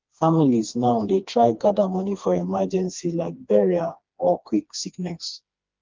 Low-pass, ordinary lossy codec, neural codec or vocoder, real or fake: 7.2 kHz; Opus, 24 kbps; codec, 16 kHz, 2 kbps, FreqCodec, smaller model; fake